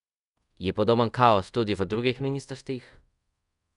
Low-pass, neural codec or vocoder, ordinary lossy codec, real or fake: 10.8 kHz; codec, 24 kHz, 0.5 kbps, DualCodec; none; fake